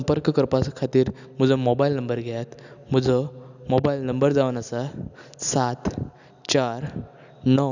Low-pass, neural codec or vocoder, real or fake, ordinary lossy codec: 7.2 kHz; none; real; none